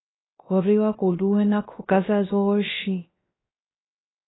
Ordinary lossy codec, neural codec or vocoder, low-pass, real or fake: AAC, 16 kbps; codec, 16 kHz, 0.3 kbps, FocalCodec; 7.2 kHz; fake